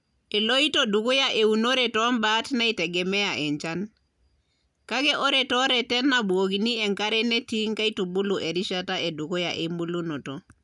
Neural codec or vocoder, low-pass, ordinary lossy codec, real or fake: none; 10.8 kHz; none; real